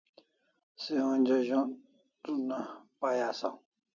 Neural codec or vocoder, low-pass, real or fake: none; 7.2 kHz; real